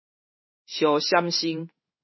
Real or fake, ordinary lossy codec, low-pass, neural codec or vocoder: fake; MP3, 24 kbps; 7.2 kHz; codec, 16 kHz in and 24 kHz out, 1 kbps, XY-Tokenizer